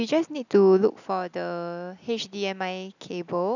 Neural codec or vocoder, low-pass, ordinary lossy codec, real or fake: none; 7.2 kHz; none; real